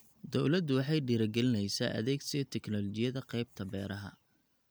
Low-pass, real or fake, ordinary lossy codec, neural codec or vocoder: none; real; none; none